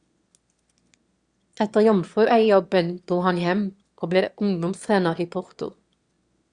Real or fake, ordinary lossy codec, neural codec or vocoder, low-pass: fake; Opus, 64 kbps; autoencoder, 22.05 kHz, a latent of 192 numbers a frame, VITS, trained on one speaker; 9.9 kHz